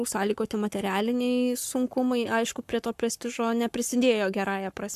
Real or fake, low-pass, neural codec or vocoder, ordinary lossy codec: fake; 14.4 kHz; codec, 44.1 kHz, 7.8 kbps, Pupu-Codec; AAC, 96 kbps